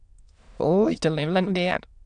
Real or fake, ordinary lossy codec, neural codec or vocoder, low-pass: fake; AAC, 64 kbps; autoencoder, 22.05 kHz, a latent of 192 numbers a frame, VITS, trained on many speakers; 9.9 kHz